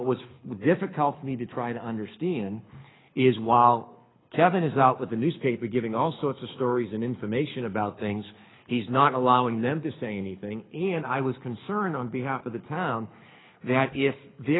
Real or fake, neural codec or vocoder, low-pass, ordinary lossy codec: fake; codec, 24 kHz, 6 kbps, HILCodec; 7.2 kHz; AAC, 16 kbps